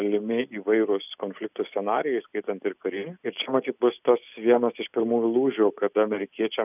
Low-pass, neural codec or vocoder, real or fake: 3.6 kHz; none; real